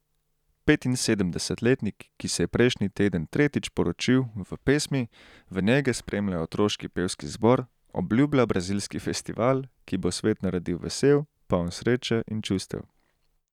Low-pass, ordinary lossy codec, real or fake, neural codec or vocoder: 19.8 kHz; none; real; none